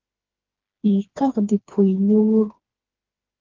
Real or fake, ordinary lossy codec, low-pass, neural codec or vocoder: fake; Opus, 16 kbps; 7.2 kHz; codec, 16 kHz, 2 kbps, FreqCodec, smaller model